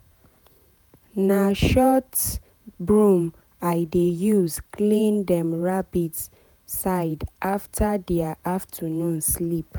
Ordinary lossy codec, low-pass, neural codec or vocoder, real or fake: none; none; vocoder, 48 kHz, 128 mel bands, Vocos; fake